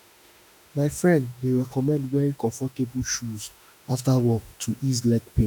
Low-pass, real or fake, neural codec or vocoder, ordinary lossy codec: none; fake; autoencoder, 48 kHz, 32 numbers a frame, DAC-VAE, trained on Japanese speech; none